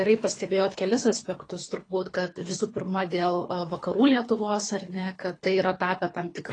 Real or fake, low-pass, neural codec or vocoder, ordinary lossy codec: fake; 9.9 kHz; codec, 24 kHz, 3 kbps, HILCodec; AAC, 32 kbps